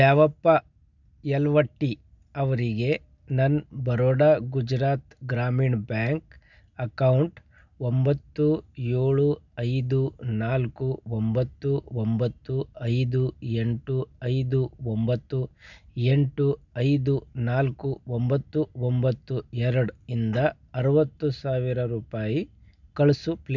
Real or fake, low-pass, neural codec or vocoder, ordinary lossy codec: real; 7.2 kHz; none; none